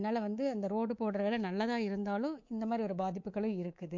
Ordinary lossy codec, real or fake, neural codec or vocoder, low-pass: MP3, 48 kbps; fake; codec, 24 kHz, 3.1 kbps, DualCodec; 7.2 kHz